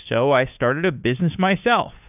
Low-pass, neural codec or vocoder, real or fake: 3.6 kHz; codec, 16 kHz, 2 kbps, FunCodec, trained on Chinese and English, 25 frames a second; fake